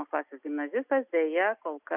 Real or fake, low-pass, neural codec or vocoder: real; 3.6 kHz; none